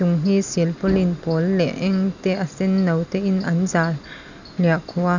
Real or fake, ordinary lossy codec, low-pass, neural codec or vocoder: real; none; 7.2 kHz; none